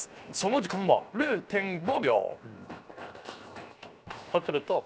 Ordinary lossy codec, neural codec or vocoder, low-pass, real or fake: none; codec, 16 kHz, 0.7 kbps, FocalCodec; none; fake